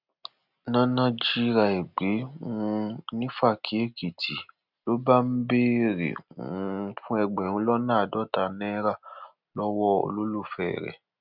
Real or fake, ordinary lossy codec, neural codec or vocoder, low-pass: real; none; none; 5.4 kHz